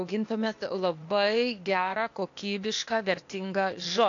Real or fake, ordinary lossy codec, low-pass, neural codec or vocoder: fake; AAC, 48 kbps; 7.2 kHz; codec, 16 kHz, 0.8 kbps, ZipCodec